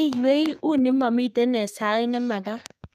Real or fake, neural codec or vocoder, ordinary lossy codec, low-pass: fake; codec, 32 kHz, 1.9 kbps, SNAC; none; 14.4 kHz